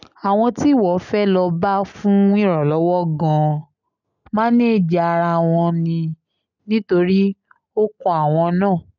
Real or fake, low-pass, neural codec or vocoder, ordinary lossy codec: real; 7.2 kHz; none; none